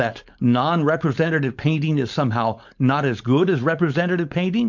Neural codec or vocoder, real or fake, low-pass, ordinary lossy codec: codec, 16 kHz, 4.8 kbps, FACodec; fake; 7.2 kHz; MP3, 64 kbps